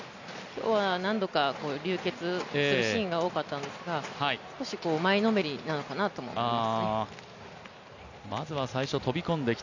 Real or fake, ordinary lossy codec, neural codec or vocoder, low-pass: real; none; none; 7.2 kHz